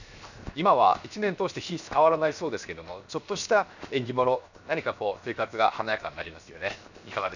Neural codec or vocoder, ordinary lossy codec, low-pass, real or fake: codec, 16 kHz, 0.7 kbps, FocalCodec; none; 7.2 kHz; fake